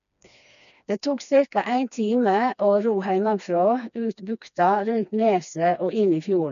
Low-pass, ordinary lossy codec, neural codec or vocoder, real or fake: 7.2 kHz; none; codec, 16 kHz, 2 kbps, FreqCodec, smaller model; fake